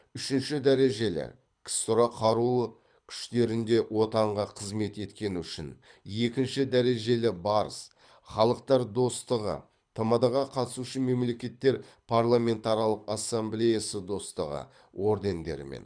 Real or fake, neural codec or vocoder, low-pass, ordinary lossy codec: fake; codec, 24 kHz, 6 kbps, HILCodec; 9.9 kHz; none